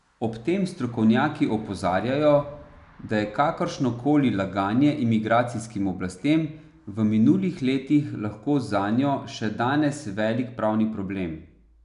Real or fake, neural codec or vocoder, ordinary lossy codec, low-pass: real; none; none; 10.8 kHz